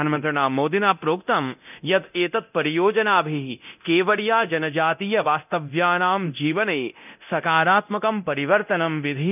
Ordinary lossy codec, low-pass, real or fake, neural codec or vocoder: none; 3.6 kHz; fake; codec, 24 kHz, 0.9 kbps, DualCodec